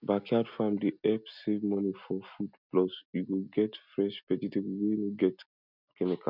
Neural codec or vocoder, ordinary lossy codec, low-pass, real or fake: none; none; 5.4 kHz; real